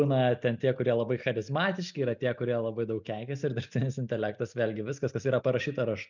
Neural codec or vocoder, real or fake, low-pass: none; real; 7.2 kHz